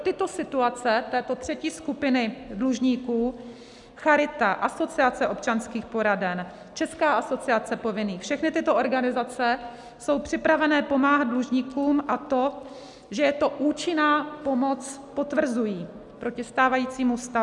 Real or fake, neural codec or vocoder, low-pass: real; none; 10.8 kHz